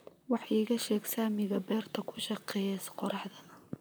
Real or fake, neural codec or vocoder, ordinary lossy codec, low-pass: fake; vocoder, 44.1 kHz, 128 mel bands, Pupu-Vocoder; none; none